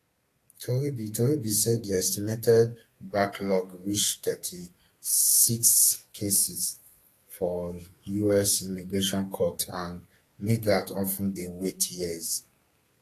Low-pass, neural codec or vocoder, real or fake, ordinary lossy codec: 14.4 kHz; codec, 32 kHz, 1.9 kbps, SNAC; fake; AAC, 48 kbps